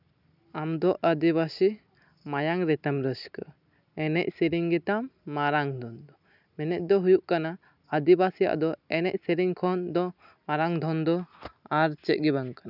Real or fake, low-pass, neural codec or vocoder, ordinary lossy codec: real; 5.4 kHz; none; none